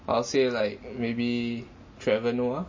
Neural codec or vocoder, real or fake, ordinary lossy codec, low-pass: none; real; MP3, 32 kbps; 7.2 kHz